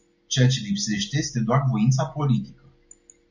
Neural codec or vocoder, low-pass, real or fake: none; 7.2 kHz; real